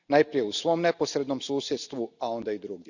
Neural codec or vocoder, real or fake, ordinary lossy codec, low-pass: none; real; none; 7.2 kHz